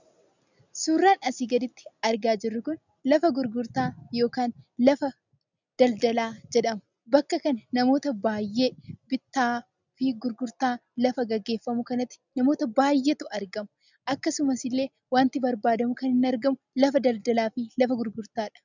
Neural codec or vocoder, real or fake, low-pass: none; real; 7.2 kHz